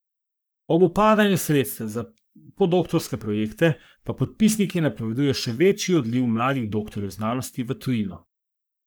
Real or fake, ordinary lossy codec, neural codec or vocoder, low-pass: fake; none; codec, 44.1 kHz, 3.4 kbps, Pupu-Codec; none